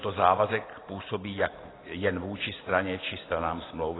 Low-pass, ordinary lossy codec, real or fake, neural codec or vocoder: 7.2 kHz; AAC, 16 kbps; real; none